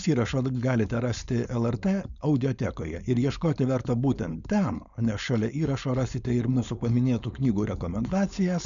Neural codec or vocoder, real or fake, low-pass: codec, 16 kHz, 4.8 kbps, FACodec; fake; 7.2 kHz